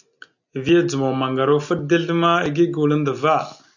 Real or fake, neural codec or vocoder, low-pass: real; none; 7.2 kHz